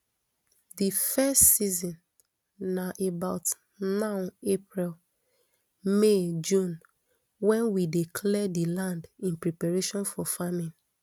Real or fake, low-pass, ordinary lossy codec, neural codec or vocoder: real; none; none; none